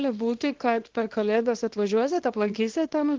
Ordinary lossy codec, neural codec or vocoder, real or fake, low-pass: Opus, 16 kbps; codec, 24 kHz, 0.9 kbps, WavTokenizer, small release; fake; 7.2 kHz